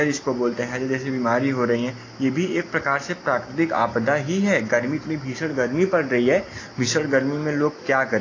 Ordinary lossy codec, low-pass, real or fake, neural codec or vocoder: AAC, 32 kbps; 7.2 kHz; real; none